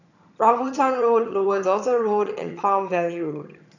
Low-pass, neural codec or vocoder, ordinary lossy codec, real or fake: 7.2 kHz; vocoder, 22.05 kHz, 80 mel bands, HiFi-GAN; none; fake